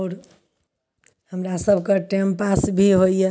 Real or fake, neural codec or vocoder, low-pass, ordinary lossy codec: real; none; none; none